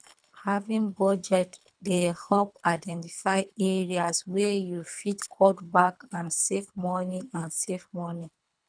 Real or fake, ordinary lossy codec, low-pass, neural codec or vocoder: fake; none; 9.9 kHz; codec, 24 kHz, 3 kbps, HILCodec